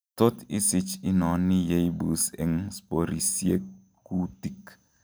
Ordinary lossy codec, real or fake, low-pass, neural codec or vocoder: none; real; none; none